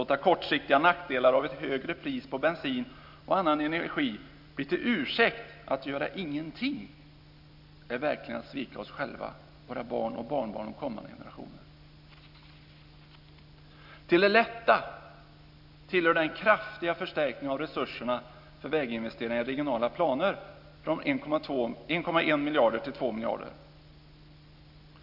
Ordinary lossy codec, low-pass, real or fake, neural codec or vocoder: none; 5.4 kHz; real; none